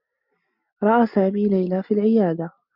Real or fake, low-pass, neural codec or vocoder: real; 5.4 kHz; none